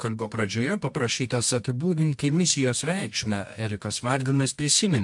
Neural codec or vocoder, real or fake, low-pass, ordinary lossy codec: codec, 24 kHz, 0.9 kbps, WavTokenizer, medium music audio release; fake; 10.8 kHz; MP3, 64 kbps